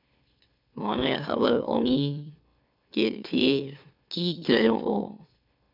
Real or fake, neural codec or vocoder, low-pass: fake; autoencoder, 44.1 kHz, a latent of 192 numbers a frame, MeloTTS; 5.4 kHz